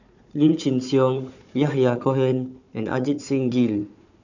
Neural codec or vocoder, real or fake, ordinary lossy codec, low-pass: codec, 16 kHz, 4 kbps, FunCodec, trained on Chinese and English, 50 frames a second; fake; none; 7.2 kHz